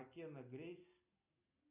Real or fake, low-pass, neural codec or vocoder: real; 3.6 kHz; none